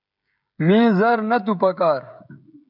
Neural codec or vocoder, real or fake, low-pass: codec, 16 kHz, 16 kbps, FreqCodec, smaller model; fake; 5.4 kHz